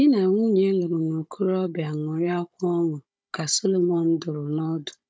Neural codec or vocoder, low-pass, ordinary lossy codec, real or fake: codec, 16 kHz, 16 kbps, FunCodec, trained on Chinese and English, 50 frames a second; none; none; fake